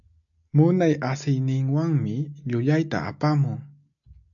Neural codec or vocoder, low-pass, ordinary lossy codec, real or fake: none; 7.2 kHz; AAC, 64 kbps; real